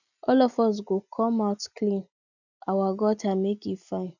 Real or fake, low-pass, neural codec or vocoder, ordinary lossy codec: real; 7.2 kHz; none; none